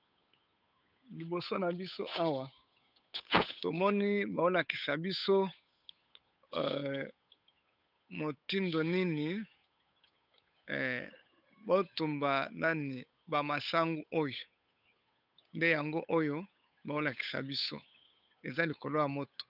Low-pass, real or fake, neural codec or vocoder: 5.4 kHz; fake; codec, 16 kHz, 8 kbps, FunCodec, trained on Chinese and English, 25 frames a second